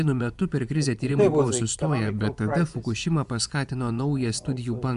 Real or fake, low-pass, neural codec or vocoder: fake; 10.8 kHz; vocoder, 24 kHz, 100 mel bands, Vocos